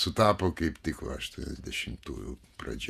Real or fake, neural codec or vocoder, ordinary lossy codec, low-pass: fake; vocoder, 48 kHz, 128 mel bands, Vocos; Opus, 64 kbps; 14.4 kHz